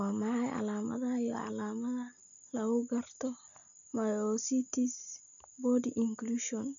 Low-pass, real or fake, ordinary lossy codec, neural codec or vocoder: 7.2 kHz; real; none; none